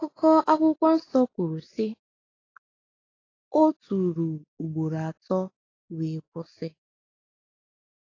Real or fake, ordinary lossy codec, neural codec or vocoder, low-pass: real; AAC, 32 kbps; none; 7.2 kHz